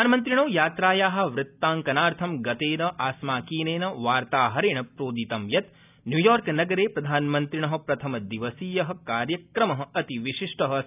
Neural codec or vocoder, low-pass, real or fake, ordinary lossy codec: none; 3.6 kHz; real; none